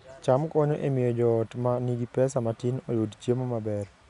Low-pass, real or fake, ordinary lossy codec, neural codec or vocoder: 10.8 kHz; real; none; none